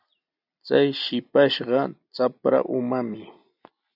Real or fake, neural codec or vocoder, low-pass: real; none; 5.4 kHz